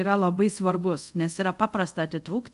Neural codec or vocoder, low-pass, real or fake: codec, 24 kHz, 0.5 kbps, DualCodec; 10.8 kHz; fake